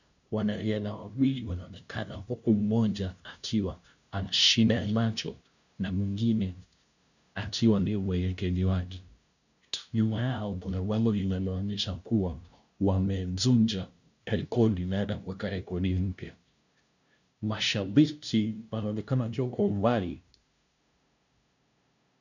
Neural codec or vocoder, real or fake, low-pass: codec, 16 kHz, 0.5 kbps, FunCodec, trained on LibriTTS, 25 frames a second; fake; 7.2 kHz